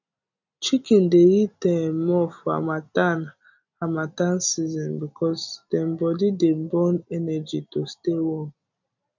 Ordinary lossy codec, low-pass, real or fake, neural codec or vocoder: none; 7.2 kHz; real; none